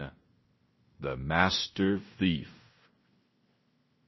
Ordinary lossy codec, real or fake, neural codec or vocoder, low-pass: MP3, 24 kbps; fake; codec, 16 kHz in and 24 kHz out, 0.9 kbps, LongCat-Audio-Codec, fine tuned four codebook decoder; 7.2 kHz